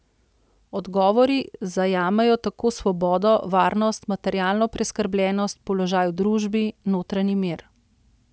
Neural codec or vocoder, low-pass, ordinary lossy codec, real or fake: none; none; none; real